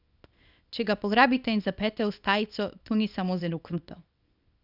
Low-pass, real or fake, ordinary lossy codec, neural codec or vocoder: 5.4 kHz; fake; none; codec, 24 kHz, 0.9 kbps, WavTokenizer, small release